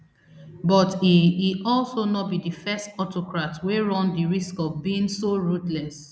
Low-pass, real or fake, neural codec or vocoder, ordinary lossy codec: none; real; none; none